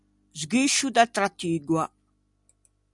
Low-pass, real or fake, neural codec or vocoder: 10.8 kHz; real; none